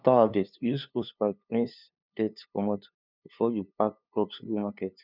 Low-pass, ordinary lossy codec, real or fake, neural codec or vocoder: 5.4 kHz; none; fake; codec, 16 kHz, 2 kbps, FunCodec, trained on LibriTTS, 25 frames a second